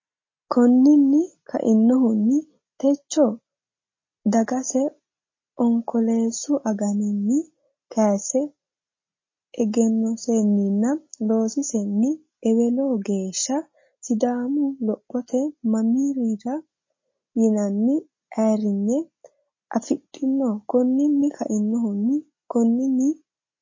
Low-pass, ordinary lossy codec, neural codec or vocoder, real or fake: 7.2 kHz; MP3, 32 kbps; none; real